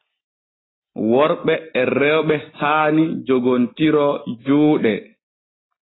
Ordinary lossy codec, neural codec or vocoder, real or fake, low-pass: AAC, 16 kbps; none; real; 7.2 kHz